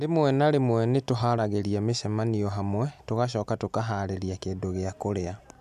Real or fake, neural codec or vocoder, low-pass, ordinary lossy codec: real; none; 14.4 kHz; none